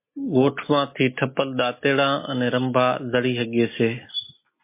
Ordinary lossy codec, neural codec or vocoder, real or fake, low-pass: MP3, 24 kbps; none; real; 3.6 kHz